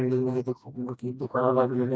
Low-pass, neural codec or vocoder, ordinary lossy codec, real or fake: none; codec, 16 kHz, 1 kbps, FreqCodec, smaller model; none; fake